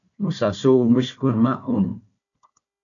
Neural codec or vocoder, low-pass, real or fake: codec, 16 kHz, 1 kbps, FunCodec, trained on Chinese and English, 50 frames a second; 7.2 kHz; fake